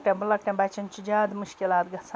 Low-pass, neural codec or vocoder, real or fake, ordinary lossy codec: none; none; real; none